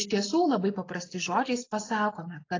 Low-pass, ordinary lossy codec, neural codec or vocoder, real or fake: 7.2 kHz; AAC, 32 kbps; none; real